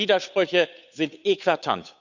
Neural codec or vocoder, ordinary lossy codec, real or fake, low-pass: codec, 16 kHz, 8 kbps, FunCodec, trained on Chinese and English, 25 frames a second; none; fake; 7.2 kHz